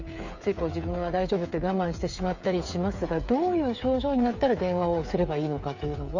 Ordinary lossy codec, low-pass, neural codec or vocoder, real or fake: none; 7.2 kHz; codec, 16 kHz, 8 kbps, FreqCodec, smaller model; fake